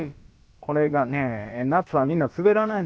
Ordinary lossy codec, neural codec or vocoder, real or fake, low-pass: none; codec, 16 kHz, about 1 kbps, DyCAST, with the encoder's durations; fake; none